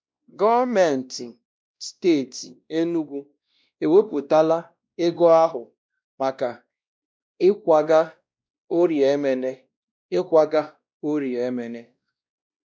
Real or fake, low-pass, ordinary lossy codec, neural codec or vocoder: fake; none; none; codec, 16 kHz, 1 kbps, X-Codec, WavLM features, trained on Multilingual LibriSpeech